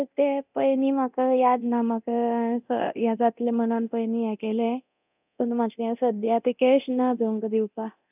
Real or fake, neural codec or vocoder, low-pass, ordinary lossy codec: fake; codec, 24 kHz, 0.9 kbps, DualCodec; 3.6 kHz; none